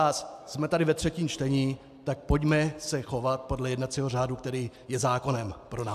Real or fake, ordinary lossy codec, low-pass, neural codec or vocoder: real; AAC, 96 kbps; 14.4 kHz; none